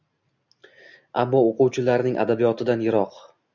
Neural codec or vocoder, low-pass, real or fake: none; 7.2 kHz; real